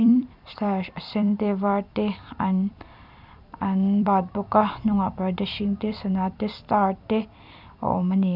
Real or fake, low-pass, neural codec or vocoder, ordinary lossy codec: fake; 5.4 kHz; vocoder, 44.1 kHz, 128 mel bands every 256 samples, BigVGAN v2; none